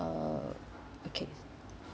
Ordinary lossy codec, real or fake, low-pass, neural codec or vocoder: none; real; none; none